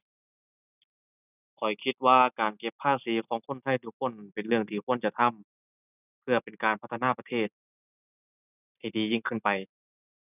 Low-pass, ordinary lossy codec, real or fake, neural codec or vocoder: 3.6 kHz; none; real; none